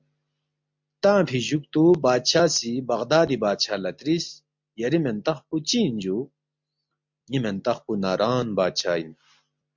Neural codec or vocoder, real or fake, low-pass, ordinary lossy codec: none; real; 7.2 kHz; MP3, 64 kbps